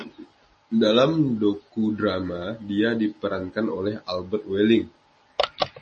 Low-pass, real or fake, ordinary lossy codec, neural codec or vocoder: 10.8 kHz; real; MP3, 32 kbps; none